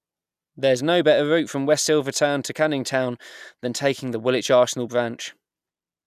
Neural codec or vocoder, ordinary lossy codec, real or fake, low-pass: none; none; real; 14.4 kHz